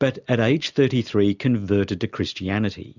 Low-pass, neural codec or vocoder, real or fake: 7.2 kHz; none; real